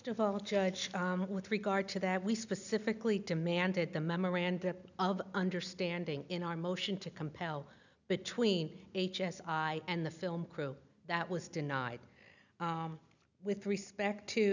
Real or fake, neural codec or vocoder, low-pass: real; none; 7.2 kHz